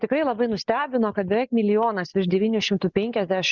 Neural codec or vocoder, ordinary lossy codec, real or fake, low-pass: none; Opus, 64 kbps; real; 7.2 kHz